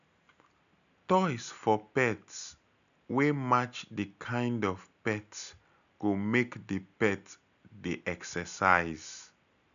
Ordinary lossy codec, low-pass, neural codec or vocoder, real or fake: none; 7.2 kHz; none; real